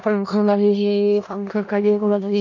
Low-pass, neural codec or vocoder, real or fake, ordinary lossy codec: 7.2 kHz; codec, 16 kHz in and 24 kHz out, 0.4 kbps, LongCat-Audio-Codec, four codebook decoder; fake; none